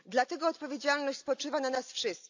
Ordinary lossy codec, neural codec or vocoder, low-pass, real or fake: AAC, 48 kbps; none; 7.2 kHz; real